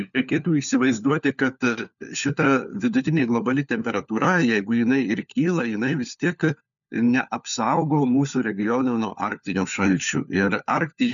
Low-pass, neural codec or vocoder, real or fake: 7.2 kHz; codec, 16 kHz, 4 kbps, FunCodec, trained on LibriTTS, 50 frames a second; fake